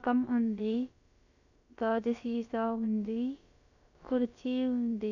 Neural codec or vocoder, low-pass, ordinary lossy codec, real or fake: codec, 16 kHz, about 1 kbps, DyCAST, with the encoder's durations; 7.2 kHz; none; fake